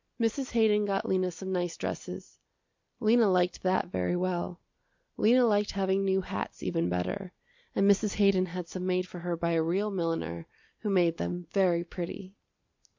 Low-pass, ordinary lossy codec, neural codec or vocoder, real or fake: 7.2 kHz; MP3, 64 kbps; none; real